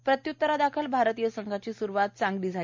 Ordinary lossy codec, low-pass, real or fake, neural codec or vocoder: MP3, 64 kbps; 7.2 kHz; real; none